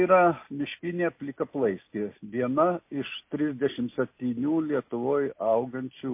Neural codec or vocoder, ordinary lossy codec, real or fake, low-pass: none; MP3, 24 kbps; real; 3.6 kHz